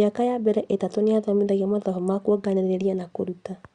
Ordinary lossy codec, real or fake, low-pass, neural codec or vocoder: Opus, 64 kbps; real; 9.9 kHz; none